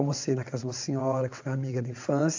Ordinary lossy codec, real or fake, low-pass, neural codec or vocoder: AAC, 48 kbps; fake; 7.2 kHz; vocoder, 22.05 kHz, 80 mel bands, WaveNeXt